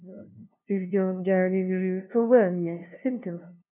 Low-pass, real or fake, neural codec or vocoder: 3.6 kHz; fake; codec, 16 kHz, 0.5 kbps, FunCodec, trained on LibriTTS, 25 frames a second